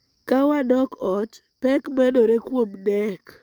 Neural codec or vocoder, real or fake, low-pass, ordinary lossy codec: codec, 44.1 kHz, 7.8 kbps, DAC; fake; none; none